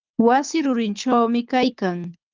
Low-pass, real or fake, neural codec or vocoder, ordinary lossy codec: 7.2 kHz; fake; codec, 24 kHz, 6 kbps, HILCodec; Opus, 24 kbps